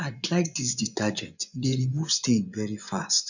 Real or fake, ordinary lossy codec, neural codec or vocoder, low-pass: fake; none; vocoder, 24 kHz, 100 mel bands, Vocos; 7.2 kHz